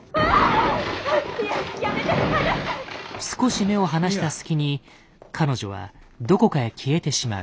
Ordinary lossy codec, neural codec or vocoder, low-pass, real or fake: none; none; none; real